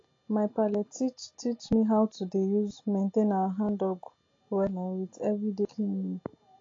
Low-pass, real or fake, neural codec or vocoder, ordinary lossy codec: 7.2 kHz; real; none; AAC, 32 kbps